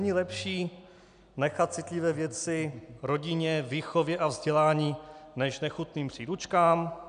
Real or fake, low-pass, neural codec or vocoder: real; 9.9 kHz; none